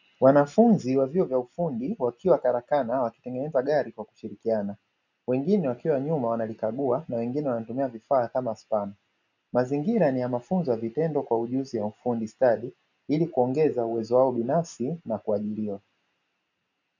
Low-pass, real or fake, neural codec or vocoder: 7.2 kHz; real; none